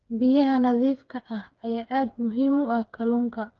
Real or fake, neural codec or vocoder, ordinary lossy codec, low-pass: fake; codec, 16 kHz, 4 kbps, FreqCodec, smaller model; Opus, 24 kbps; 7.2 kHz